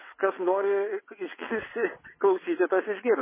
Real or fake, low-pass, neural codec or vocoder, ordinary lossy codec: fake; 3.6 kHz; vocoder, 22.05 kHz, 80 mel bands, WaveNeXt; MP3, 16 kbps